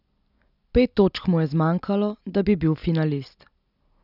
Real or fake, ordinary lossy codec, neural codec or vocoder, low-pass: real; none; none; 5.4 kHz